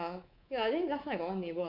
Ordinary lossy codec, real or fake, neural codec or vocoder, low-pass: none; fake; codec, 24 kHz, 3.1 kbps, DualCodec; 5.4 kHz